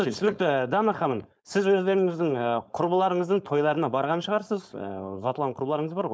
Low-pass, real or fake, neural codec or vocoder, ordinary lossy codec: none; fake; codec, 16 kHz, 4.8 kbps, FACodec; none